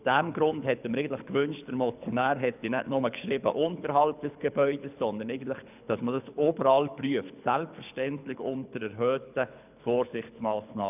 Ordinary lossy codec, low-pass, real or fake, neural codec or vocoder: none; 3.6 kHz; fake; codec, 24 kHz, 6 kbps, HILCodec